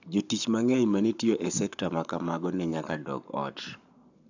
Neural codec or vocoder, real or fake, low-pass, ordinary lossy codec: codec, 44.1 kHz, 7.8 kbps, Pupu-Codec; fake; 7.2 kHz; none